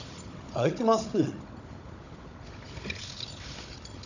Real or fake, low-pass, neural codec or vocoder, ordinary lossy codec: fake; 7.2 kHz; codec, 16 kHz, 16 kbps, FunCodec, trained on Chinese and English, 50 frames a second; none